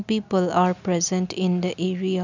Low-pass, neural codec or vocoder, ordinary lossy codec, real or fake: 7.2 kHz; none; none; real